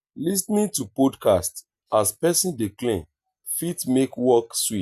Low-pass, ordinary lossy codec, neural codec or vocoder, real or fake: 14.4 kHz; none; none; real